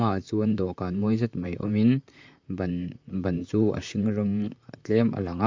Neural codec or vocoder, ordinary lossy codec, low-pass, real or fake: vocoder, 44.1 kHz, 128 mel bands, Pupu-Vocoder; MP3, 64 kbps; 7.2 kHz; fake